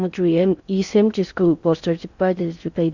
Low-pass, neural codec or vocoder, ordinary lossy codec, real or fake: 7.2 kHz; codec, 16 kHz in and 24 kHz out, 0.6 kbps, FocalCodec, streaming, 4096 codes; Opus, 64 kbps; fake